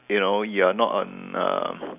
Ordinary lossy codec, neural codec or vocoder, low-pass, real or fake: none; none; 3.6 kHz; real